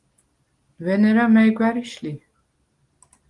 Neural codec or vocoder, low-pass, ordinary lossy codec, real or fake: none; 10.8 kHz; Opus, 24 kbps; real